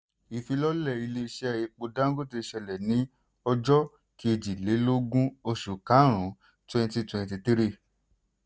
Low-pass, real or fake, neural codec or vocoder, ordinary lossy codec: none; real; none; none